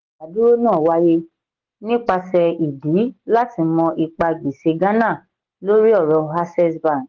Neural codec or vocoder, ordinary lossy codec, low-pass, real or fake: none; Opus, 16 kbps; 7.2 kHz; real